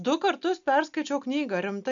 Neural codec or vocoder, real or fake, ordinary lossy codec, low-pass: none; real; MP3, 96 kbps; 7.2 kHz